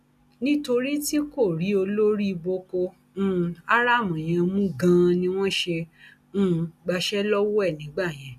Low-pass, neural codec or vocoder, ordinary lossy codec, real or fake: 14.4 kHz; none; none; real